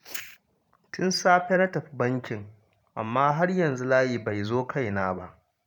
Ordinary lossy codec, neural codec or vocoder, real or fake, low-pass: none; vocoder, 44.1 kHz, 128 mel bands every 512 samples, BigVGAN v2; fake; 19.8 kHz